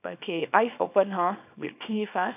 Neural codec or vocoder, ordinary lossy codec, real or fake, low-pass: codec, 24 kHz, 0.9 kbps, WavTokenizer, small release; none; fake; 3.6 kHz